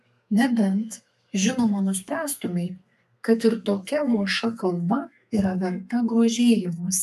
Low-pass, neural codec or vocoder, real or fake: 14.4 kHz; codec, 32 kHz, 1.9 kbps, SNAC; fake